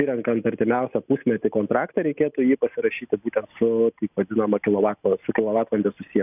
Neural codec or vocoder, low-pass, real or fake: none; 3.6 kHz; real